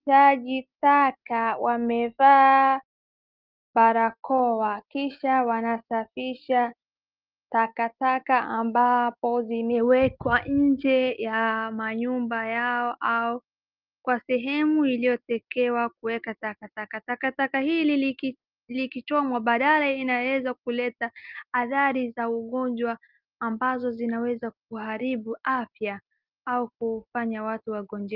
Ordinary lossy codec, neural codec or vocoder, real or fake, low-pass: Opus, 32 kbps; none; real; 5.4 kHz